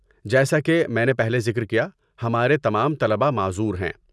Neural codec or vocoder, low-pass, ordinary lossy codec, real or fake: none; none; none; real